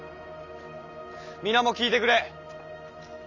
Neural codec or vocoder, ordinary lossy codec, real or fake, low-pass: none; none; real; 7.2 kHz